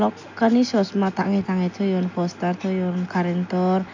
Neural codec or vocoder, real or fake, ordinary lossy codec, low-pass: none; real; AAC, 48 kbps; 7.2 kHz